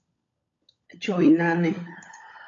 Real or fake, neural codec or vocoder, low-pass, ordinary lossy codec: fake; codec, 16 kHz, 16 kbps, FunCodec, trained on LibriTTS, 50 frames a second; 7.2 kHz; AAC, 48 kbps